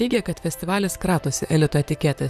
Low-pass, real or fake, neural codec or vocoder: 14.4 kHz; fake; vocoder, 44.1 kHz, 128 mel bands, Pupu-Vocoder